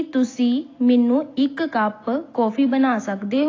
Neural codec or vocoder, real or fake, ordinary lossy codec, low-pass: none; real; AAC, 32 kbps; 7.2 kHz